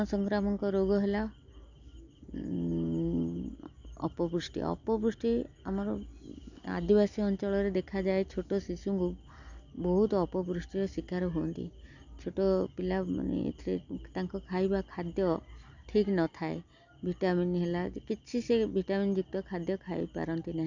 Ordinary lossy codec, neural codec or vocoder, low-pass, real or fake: none; none; 7.2 kHz; real